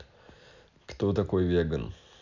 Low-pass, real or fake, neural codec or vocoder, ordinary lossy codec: 7.2 kHz; real; none; none